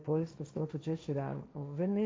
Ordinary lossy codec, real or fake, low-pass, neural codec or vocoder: none; fake; none; codec, 16 kHz, 1.1 kbps, Voila-Tokenizer